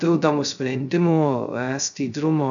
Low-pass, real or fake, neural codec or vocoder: 7.2 kHz; fake; codec, 16 kHz, 0.2 kbps, FocalCodec